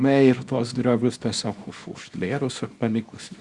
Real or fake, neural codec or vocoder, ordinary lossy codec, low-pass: fake; codec, 24 kHz, 0.9 kbps, WavTokenizer, small release; Opus, 64 kbps; 10.8 kHz